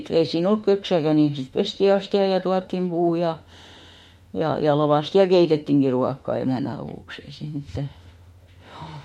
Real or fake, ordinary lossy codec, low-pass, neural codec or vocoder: fake; MP3, 64 kbps; 19.8 kHz; autoencoder, 48 kHz, 32 numbers a frame, DAC-VAE, trained on Japanese speech